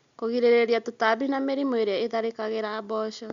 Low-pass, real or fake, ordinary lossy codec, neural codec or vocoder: 7.2 kHz; real; Opus, 64 kbps; none